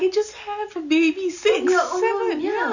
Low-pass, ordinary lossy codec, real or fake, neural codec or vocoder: 7.2 kHz; none; real; none